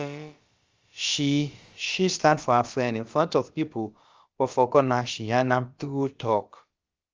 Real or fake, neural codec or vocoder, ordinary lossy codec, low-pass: fake; codec, 16 kHz, about 1 kbps, DyCAST, with the encoder's durations; Opus, 32 kbps; 7.2 kHz